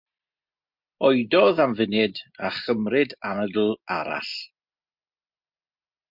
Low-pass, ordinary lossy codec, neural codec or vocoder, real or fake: 5.4 kHz; MP3, 48 kbps; none; real